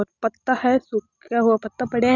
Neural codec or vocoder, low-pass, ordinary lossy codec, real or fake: none; 7.2 kHz; none; real